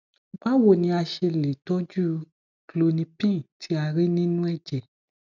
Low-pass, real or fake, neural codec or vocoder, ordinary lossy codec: 7.2 kHz; real; none; none